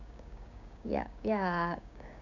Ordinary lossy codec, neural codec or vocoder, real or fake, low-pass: none; codec, 16 kHz, 6 kbps, DAC; fake; 7.2 kHz